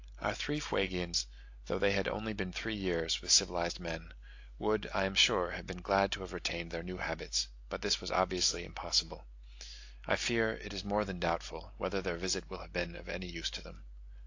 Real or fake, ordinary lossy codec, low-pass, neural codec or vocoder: real; AAC, 48 kbps; 7.2 kHz; none